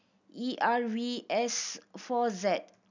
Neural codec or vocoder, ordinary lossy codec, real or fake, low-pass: none; none; real; 7.2 kHz